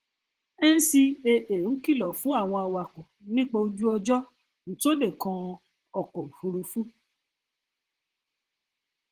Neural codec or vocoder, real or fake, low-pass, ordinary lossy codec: vocoder, 44.1 kHz, 128 mel bands, Pupu-Vocoder; fake; 14.4 kHz; Opus, 16 kbps